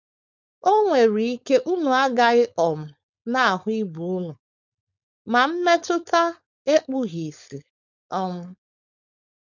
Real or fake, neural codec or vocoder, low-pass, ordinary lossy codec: fake; codec, 16 kHz, 4.8 kbps, FACodec; 7.2 kHz; none